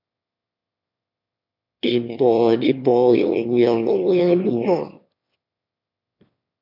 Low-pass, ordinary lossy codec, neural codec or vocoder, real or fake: 5.4 kHz; MP3, 48 kbps; autoencoder, 22.05 kHz, a latent of 192 numbers a frame, VITS, trained on one speaker; fake